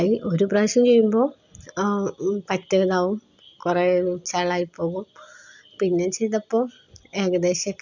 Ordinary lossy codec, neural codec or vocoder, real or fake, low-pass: none; none; real; 7.2 kHz